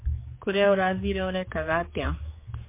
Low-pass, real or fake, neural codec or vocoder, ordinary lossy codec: 3.6 kHz; fake; codec, 16 kHz, 4 kbps, X-Codec, HuBERT features, trained on general audio; MP3, 24 kbps